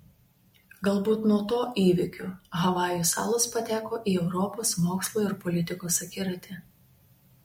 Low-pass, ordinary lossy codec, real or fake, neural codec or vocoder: 19.8 kHz; MP3, 64 kbps; real; none